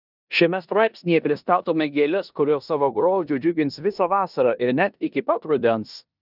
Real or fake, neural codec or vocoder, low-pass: fake; codec, 16 kHz in and 24 kHz out, 0.9 kbps, LongCat-Audio-Codec, four codebook decoder; 5.4 kHz